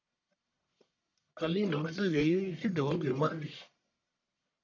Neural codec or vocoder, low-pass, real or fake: codec, 44.1 kHz, 1.7 kbps, Pupu-Codec; 7.2 kHz; fake